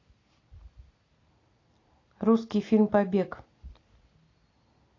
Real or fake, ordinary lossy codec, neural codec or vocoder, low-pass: real; AAC, 32 kbps; none; 7.2 kHz